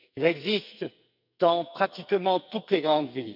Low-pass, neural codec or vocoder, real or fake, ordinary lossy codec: 5.4 kHz; codec, 44.1 kHz, 2.6 kbps, SNAC; fake; none